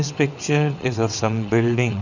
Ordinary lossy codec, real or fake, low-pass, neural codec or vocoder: none; fake; 7.2 kHz; vocoder, 22.05 kHz, 80 mel bands, WaveNeXt